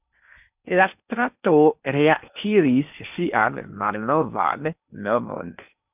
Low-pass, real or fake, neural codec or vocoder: 3.6 kHz; fake; codec, 16 kHz in and 24 kHz out, 0.8 kbps, FocalCodec, streaming, 65536 codes